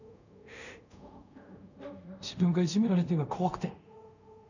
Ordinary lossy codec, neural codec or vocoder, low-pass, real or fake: none; codec, 24 kHz, 0.5 kbps, DualCodec; 7.2 kHz; fake